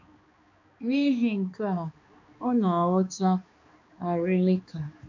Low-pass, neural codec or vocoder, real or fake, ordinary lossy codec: 7.2 kHz; codec, 16 kHz, 2 kbps, X-Codec, HuBERT features, trained on balanced general audio; fake; MP3, 48 kbps